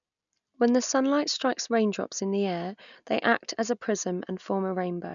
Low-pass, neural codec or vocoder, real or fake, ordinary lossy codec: 7.2 kHz; none; real; none